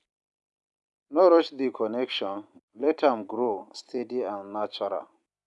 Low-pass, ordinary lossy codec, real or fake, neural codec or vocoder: 10.8 kHz; none; fake; vocoder, 44.1 kHz, 128 mel bands every 256 samples, BigVGAN v2